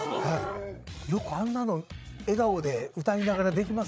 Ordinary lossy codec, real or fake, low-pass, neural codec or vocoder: none; fake; none; codec, 16 kHz, 8 kbps, FreqCodec, larger model